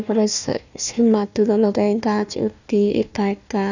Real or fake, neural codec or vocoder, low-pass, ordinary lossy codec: fake; codec, 16 kHz, 1 kbps, FunCodec, trained on Chinese and English, 50 frames a second; 7.2 kHz; none